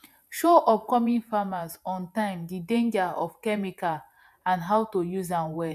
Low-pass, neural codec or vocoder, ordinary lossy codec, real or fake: 14.4 kHz; vocoder, 48 kHz, 128 mel bands, Vocos; none; fake